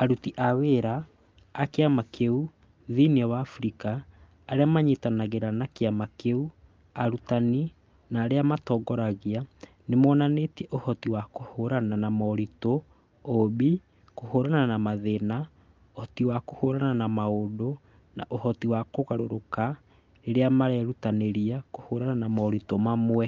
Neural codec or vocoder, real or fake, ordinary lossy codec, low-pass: none; real; Opus, 24 kbps; 7.2 kHz